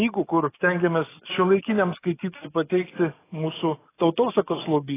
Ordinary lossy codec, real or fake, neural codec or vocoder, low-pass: AAC, 16 kbps; fake; codec, 44.1 kHz, 7.8 kbps, DAC; 3.6 kHz